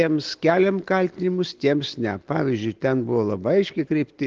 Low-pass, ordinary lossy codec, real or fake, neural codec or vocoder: 7.2 kHz; Opus, 16 kbps; real; none